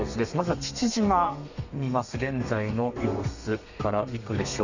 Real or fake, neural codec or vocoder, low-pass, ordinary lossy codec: fake; codec, 32 kHz, 1.9 kbps, SNAC; 7.2 kHz; none